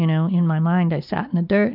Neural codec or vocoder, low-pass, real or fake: none; 5.4 kHz; real